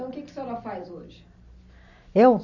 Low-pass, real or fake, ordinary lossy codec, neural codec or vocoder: 7.2 kHz; real; none; none